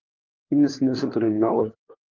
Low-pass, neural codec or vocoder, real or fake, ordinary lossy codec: 7.2 kHz; codec, 16 kHz, 4 kbps, FunCodec, trained on LibriTTS, 50 frames a second; fake; Opus, 32 kbps